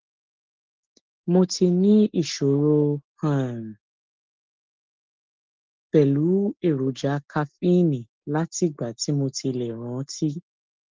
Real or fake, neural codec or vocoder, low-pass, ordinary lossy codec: real; none; 7.2 kHz; Opus, 16 kbps